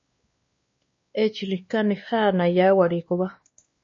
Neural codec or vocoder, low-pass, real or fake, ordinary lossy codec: codec, 16 kHz, 2 kbps, X-Codec, WavLM features, trained on Multilingual LibriSpeech; 7.2 kHz; fake; MP3, 32 kbps